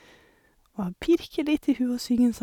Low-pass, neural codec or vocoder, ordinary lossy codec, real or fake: 19.8 kHz; none; none; real